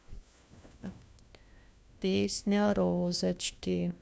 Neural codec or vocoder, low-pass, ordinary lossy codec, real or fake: codec, 16 kHz, 1 kbps, FunCodec, trained on LibriTTS, 50 frames a second; none; none; fake